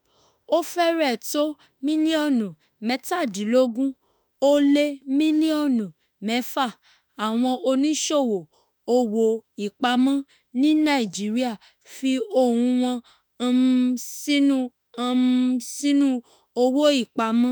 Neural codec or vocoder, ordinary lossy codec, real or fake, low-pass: autoencoder, 48 kHz, 32 numbers a frame, DAC-VAE, trained on Japanese speech; none; fake; none